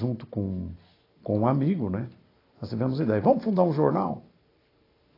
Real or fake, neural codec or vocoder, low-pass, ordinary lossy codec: real; none; 5.4 kHz; AAC, 24 kbps